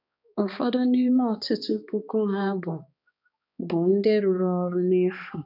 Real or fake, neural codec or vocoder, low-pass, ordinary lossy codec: fake; codec, 16 kHz, 2 kbps, X-Codec, HuBERT features, trained on balanced general audio; 5.4 kHz; none